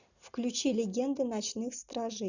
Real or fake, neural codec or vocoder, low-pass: real; none; 7.2 kHz